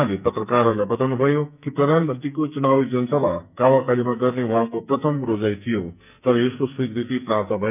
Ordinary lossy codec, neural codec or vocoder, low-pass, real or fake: none; codec, 44.1 kHz, 2.6 kbps, SNAC; 3.6 kHz; fake